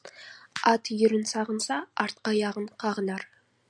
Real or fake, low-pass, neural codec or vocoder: real; 9.9 kHz; none